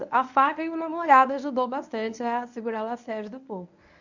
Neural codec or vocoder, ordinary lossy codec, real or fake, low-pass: codec, 24 kHz, 0.9 kbps, WavTokenizer, medium speech release version 1; none; fake; 7.2 kHz